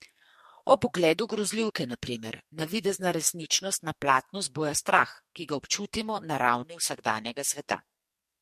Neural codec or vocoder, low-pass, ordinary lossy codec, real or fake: codec, 44.1 kHz, 2.6 kbps, SNAC; 14.4 kHz; MP3, 64 kbps; fake